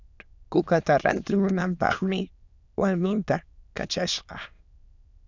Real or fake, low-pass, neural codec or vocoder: fake; 7.2 kHz; autoencoder, 22.05 kHz, a latent of 192 numbers a frame, VITS, trained on many speakers